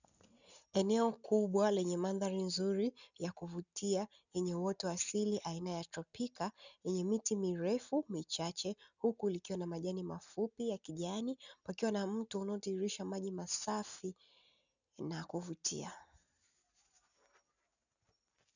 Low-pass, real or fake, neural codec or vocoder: 7.2 kHz; real; none